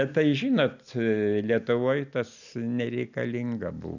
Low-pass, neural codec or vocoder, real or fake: 7.2 kHz; none; real